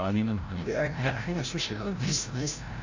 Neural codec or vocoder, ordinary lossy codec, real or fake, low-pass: codec, 16 kHz, 0.5 kbps, FreqCodec, larger model; AAC, 48 kbps; fake; 7.2 kHz